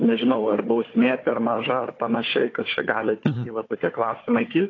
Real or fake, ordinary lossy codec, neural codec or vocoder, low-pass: fake; AAC, 32 kbps; codec, 16 kHz, 4 kbps, FunCodec, trained on Chinese and English, 50 frames a second; 7.2 kHz